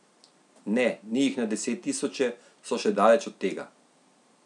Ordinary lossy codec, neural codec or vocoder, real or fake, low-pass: none; none; real; 10.8 kHz